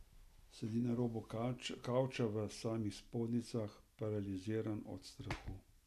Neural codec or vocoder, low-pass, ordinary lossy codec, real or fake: none; 14.4 kHz; none; real